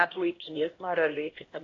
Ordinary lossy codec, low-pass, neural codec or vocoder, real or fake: AAC, 32 kbps; 7.2 kHz; codec, 16 kHz, 1 kbps, X-Codec, HuBERT features, trained on LibriSpeech; fake